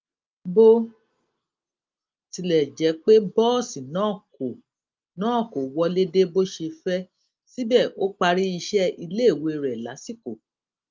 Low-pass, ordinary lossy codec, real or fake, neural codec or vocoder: 7.2 kHz; Opus, 24 kbps; real; none